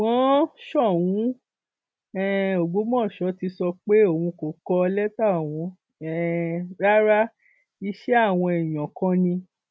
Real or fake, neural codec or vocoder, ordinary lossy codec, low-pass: real; none; none; none